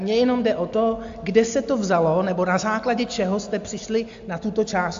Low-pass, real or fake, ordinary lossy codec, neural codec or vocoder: 7.2 kHz; real; AAC, 64 kbps; none